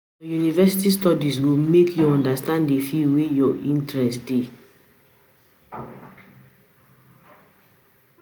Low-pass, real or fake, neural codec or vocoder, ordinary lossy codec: none; real; none; none